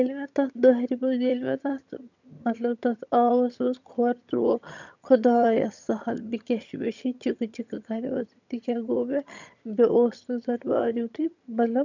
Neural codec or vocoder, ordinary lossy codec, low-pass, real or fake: vocoder, 22.05 kHz, 80 mel bands, HiFi-GAN; none; 7.2 kHz; fake